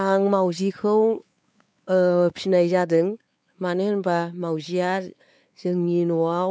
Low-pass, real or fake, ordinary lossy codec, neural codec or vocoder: none; fake; none; codec, 16 kHz, 4 kbps, X-Codec, WavLM features, trained on Multilingual LibriSpeech